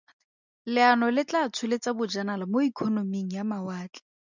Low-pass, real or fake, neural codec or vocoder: 7.2 kHz; real; none